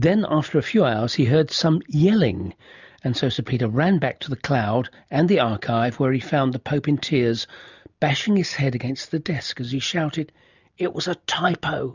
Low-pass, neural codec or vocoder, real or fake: 7.2 kHz; none; real